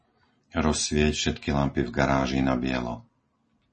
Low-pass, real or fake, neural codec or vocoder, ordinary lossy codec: 10.8 kHz; real; none; MP3, 32 kbps